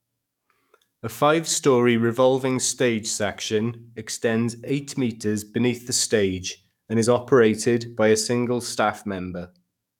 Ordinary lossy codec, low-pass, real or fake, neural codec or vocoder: none; 19.8 kHz; fake; codec, 44.1 kHz, 7.8 kbps, DAC